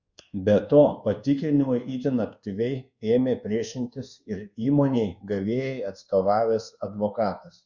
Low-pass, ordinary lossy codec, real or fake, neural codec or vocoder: 7.2 kHz; Opus, 64 kbps; fake; codec, 24 kHz, 1.2 kbps, DualCodec